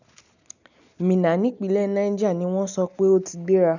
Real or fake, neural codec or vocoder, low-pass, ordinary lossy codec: real; none; 7.2 kHz; none